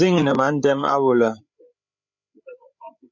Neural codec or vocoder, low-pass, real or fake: codec, 16 kHz, 8 kbps, FreqCodec, larger model; 7.2 kHz; fake